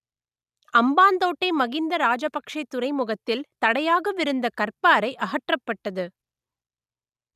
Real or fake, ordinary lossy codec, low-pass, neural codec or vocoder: real; none; 14.4 kHz; none